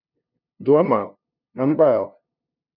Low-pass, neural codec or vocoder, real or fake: 5.4 kHz; codec, 16 kHz, 0.5 kbps, FunCodec, trained on LibriTTS, 25 frames a second; fake